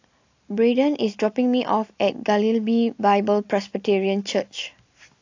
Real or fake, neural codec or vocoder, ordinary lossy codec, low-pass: real; none; AAC, 48 kbps; 7.2 kHz